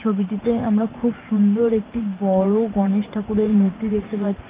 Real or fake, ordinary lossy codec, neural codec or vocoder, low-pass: fake; Opus, 24 kbps; vocoder, 44.1 kHz, 128 mel bands every 512 samples, BigVGAN v2; 3.6 kHz